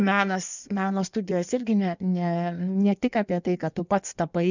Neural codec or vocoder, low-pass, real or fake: codec, 16 kHz in and 24 kHz out, 1.1 kbps, FireRedTTS-2 codec; 7.2 kHz; fake